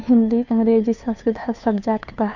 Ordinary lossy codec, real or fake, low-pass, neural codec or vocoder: none; fake; 7.2 kHz; codec, 16 kHz, 2 kbps, FunCodec, trained on Chinese and English, 25 frames a second